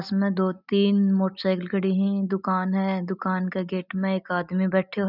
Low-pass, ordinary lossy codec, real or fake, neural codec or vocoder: 5.4 kHz; none; real; none